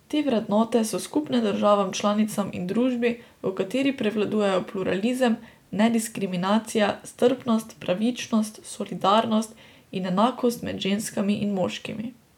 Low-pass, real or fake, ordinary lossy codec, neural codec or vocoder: 19.8 kHz; real; none; none